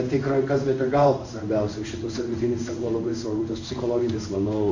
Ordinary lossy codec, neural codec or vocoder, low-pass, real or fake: AAC, 48 kbps; codec, 16 kHz in and 24 kHz out, 1 kbps, XY-Tokenizer; 7.2 kHz; fake